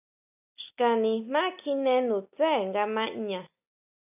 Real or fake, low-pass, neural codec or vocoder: real; 3.6 kHz; none